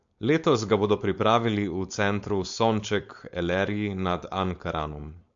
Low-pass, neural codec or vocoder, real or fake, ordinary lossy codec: 7.2 kHz; codec, 16 kHz, 4.8 kbps, FACodec; fake; MP3, 48 kbps